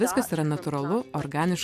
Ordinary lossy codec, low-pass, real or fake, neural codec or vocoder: AAC, 96 kbps; 14.4 kHz; real; none